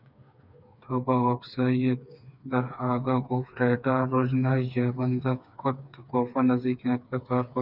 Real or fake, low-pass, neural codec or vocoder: fake; 5.4 kHz; codec, 16 kHz, 4 kbps, FreqCodec, smaller model